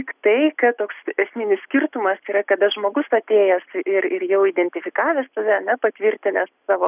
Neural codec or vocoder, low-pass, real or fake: none; 3.6 kHz; real